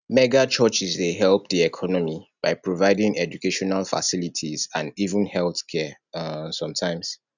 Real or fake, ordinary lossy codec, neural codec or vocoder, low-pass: real; none; none; 7.2 kHz